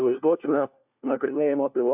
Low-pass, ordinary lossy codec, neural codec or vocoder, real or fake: 3.6 kHz; AAC, 32 kbps; codec, 16 kHz, 1 kbps, FunCodec, trained on LibriTTS, 50 frames a second; fake